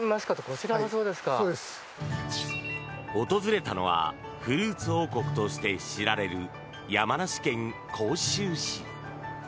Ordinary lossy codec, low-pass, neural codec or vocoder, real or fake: none; none; none; real